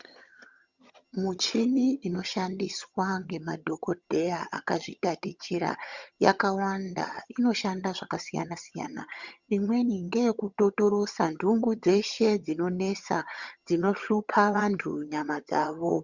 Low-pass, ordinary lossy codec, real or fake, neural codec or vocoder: 7.2 kHz; Opus, 64 kbps; fake; vocoder, 22.05 kHz, 80 mel bands, HiFi-GAN